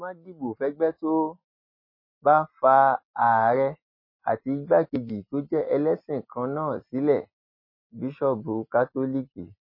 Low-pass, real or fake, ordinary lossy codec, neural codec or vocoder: 5.4 kHz; real; MP3, 24 kbps; none